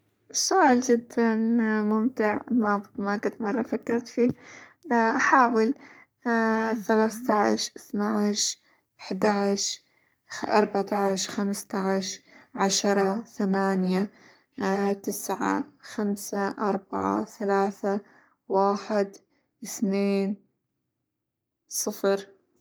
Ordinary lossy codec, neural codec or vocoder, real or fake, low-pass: none; codec, 44.1 kHz, 3.4 kbps, Pupu-Codec; fake; none